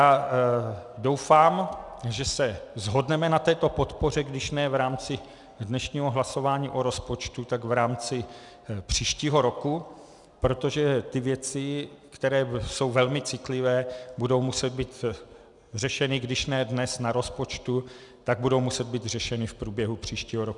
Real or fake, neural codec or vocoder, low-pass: real; none; 10.8 kHz